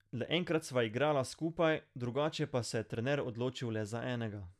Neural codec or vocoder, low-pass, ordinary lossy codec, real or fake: none; none; none; real